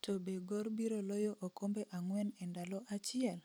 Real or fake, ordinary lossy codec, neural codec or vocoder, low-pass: fake; none; vocoder, 44.1 kHz, 128 mel bands every 256 samples, BigVGAN v2; none